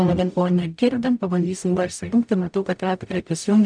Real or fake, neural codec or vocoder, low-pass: fake; codec, 44.1 kHz, 0.9 kbps, DAC; 9.9 kHz